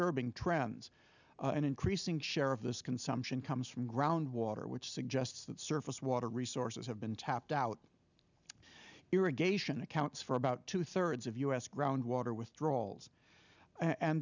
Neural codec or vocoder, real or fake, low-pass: none; real; 7.2 kHz